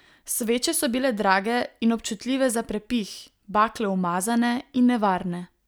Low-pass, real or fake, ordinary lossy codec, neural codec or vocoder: none; real; none; none